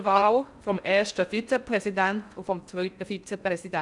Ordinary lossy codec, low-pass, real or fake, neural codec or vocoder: none; 10.8 kHz; fake; codec, 16 kHz in and 24 kHz out, 0.6 kbps, FocalCodec, streaming, 4096 codes